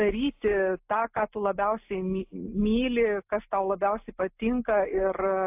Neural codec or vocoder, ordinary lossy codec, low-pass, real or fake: none; AAC, 32 kbps; 3.6 kHz; real